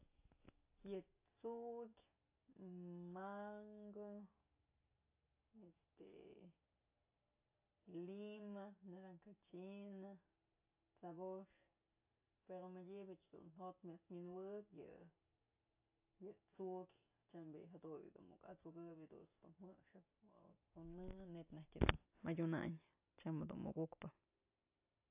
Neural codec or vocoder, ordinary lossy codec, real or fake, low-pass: none; AAC, 32 kbps; real; 3.6 kHz